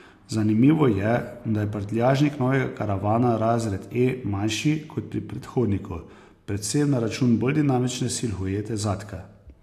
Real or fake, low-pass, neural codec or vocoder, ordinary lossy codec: real; 14.4 kHz; none; AAC, 64 kbps